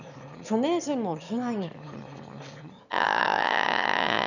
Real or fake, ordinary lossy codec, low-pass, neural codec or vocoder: fake; none; 7.2 kHz; autoencoder, 22.05 kHz, a latent of 192 numbers a frame, VITS, trained on one speaker